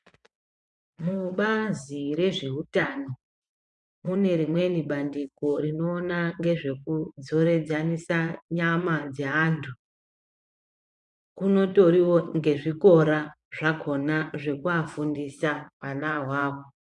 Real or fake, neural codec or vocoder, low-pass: fake; vocoder, 24 kHz, 100 mel bands, Vocos; 10.8 kHz